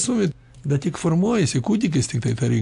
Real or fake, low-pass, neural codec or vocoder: real; 10.8 kHz; none